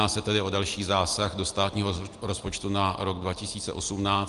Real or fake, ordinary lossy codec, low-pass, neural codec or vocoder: real; Opus, 32 kbps; 10.8 kHz; none